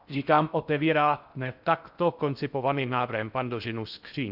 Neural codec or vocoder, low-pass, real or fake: codec, 16 kHz in and 24 kHz out, 0.6 kbps, FocalCodec, streaming, 4096 codes; 5.4 kHz; fake